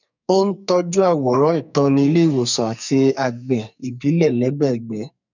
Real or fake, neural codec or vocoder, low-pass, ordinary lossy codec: fake; codec, 44.1 kHz, 2.6 kbps, SNAC; 7.2 kHz; none